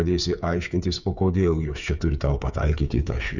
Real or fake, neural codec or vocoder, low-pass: fake; codec, 16 kHz, 8 kbps, FreqCodec, smaller model; 7.2 kHz